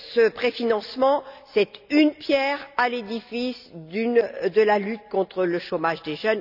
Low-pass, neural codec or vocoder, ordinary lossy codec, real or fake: 5.4 kHz; none; none; real